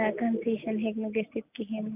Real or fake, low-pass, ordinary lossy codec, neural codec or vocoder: real; 3.6 kHz; AAC, 32 kbps; none